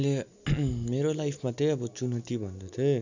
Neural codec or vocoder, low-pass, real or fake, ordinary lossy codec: none; 7.2 kHz; real; none